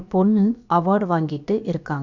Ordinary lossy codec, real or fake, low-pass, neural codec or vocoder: none; fake; 7.2 kHz; codec, 16 kHz, about 1 kbps, DyCAST, with the encoder's durations